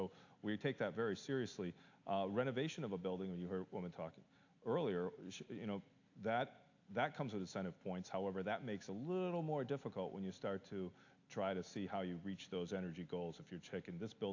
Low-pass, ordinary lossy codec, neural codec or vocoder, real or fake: 7.2 kHz; AAC, 48 kbps; none; real